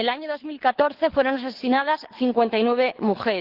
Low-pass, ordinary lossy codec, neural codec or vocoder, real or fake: 5.4 kHz; Opus, 16 kbps; codec, 24 kHz, 6 kbps, HILCodec; fake